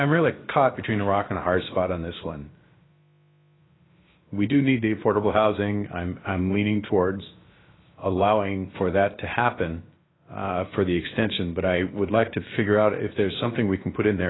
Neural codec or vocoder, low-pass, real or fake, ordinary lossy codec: codec, 16 kHz, about 1 kbps, DyCAST, with the encoder's durations; 7.2 kHz; fake; AAC, 16 kbps